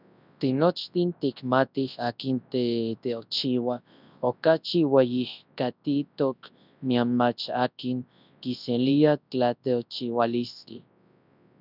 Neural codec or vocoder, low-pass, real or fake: codec, 24 kHz, 0.9 kbps, WavTokenizer, large speech release; 5.4 kHz; fake